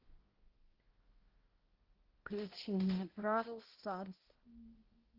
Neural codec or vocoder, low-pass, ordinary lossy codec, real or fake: codec, 16 kHz, 0.5 kbps, X-Codec, HuBERT features, trained on balanced general audio; 5.4 kHz; Opus, 16 kbps; fake